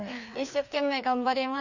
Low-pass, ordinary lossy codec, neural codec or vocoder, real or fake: 7.2 kHz; none; codec, 16 kHz, 2 kbps, FreqCodec, larger model; fake